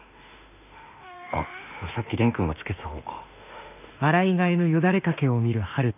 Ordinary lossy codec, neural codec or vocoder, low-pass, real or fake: none; autoencoder, 48 kHz, 32 numbers a frame, DAC-VAE, trained on Japanese speech; 3.6 kHz; fake